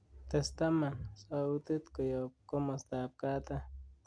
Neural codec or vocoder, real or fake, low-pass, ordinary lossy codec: none; real; 9.9 kHz; none